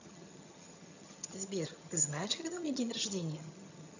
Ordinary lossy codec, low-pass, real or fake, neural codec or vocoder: none; 7.2 kHz; fake; vocoder, 22.05 kHz, 80 mel bands, HiFi-GAN